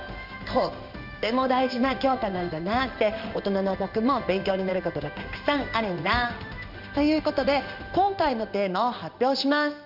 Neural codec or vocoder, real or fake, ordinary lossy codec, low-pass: codec, 16 kHz in and 24 kHz out, 1 kbps, XY-Tokenizer; fake; none; 5.4 kHz